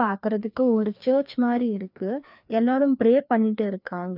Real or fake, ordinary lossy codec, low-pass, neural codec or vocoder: fake; none; 5.4 kHz; codec, 16 kHz, 2 kbps, FreqCodec, larger model